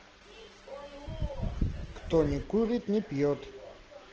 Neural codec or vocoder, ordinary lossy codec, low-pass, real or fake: none; Opus, 16 kbps; 7.2 kHz; real